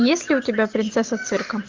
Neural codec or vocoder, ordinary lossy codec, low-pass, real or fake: none; Opus, 24 kbps; 7.2 kHz; real